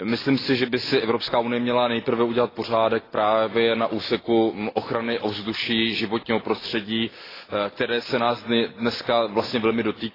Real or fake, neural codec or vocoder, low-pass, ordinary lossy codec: real; none; 5.4 kHz; AAC, 24 kbps